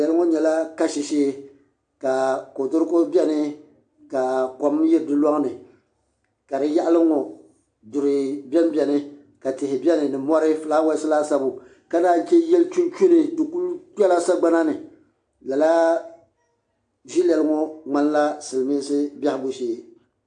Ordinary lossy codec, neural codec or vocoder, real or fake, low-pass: AAC, 64 kbps; none; real; 9.9 kHz